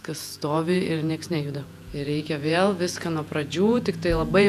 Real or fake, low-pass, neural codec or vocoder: fake; 14.4 kHz; vocoder, 48 kHz, 128 mel bands, Vocos